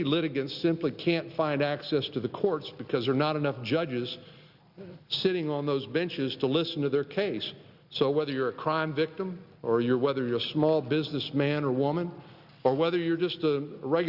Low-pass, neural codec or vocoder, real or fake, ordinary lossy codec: 5.4 kHz; none; real; Opus, 64 kbps